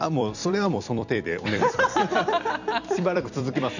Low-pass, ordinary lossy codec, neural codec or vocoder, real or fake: 7.2 kHz; none; vocoder, 44.1 kHz, 128 mel bands every 256 samples, BigVGAN v2; fake